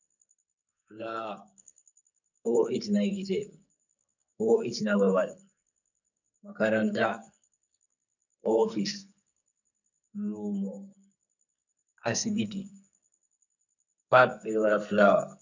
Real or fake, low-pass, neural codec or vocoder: fake; 7.2 kHz; codec, 44.1 kHz, 2.6 kbps, SNAC